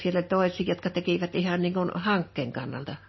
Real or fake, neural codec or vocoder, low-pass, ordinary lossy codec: real; none; 7.2 kHz; MP3, 24 kbps